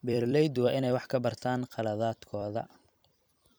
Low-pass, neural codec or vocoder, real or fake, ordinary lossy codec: none; vocoder, 44.1 kHz, 128 mel bands every 256 samples, BigVGAN v2; fake; none